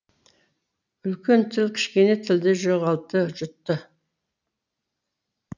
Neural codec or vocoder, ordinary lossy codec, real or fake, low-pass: none; none; real; 7.2 kHz